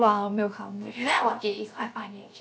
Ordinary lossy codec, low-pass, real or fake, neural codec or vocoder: none; none; fake; codec, 16 kHz, 0.7 kbps, FocalCodec